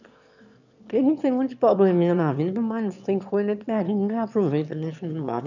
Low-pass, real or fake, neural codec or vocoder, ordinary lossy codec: 7.2 kHz; fake; autoencoder, 22.05 kHz, a latent of 192 numbers a frame, VITS, trained on one speaker; none